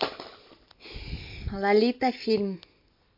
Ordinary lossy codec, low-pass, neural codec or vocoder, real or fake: MP3, 32 kbps; 5.4 kHz; none; real